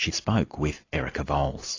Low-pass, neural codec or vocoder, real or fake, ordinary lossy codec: 7.2 kHz; none; real; AAC, 32 kbps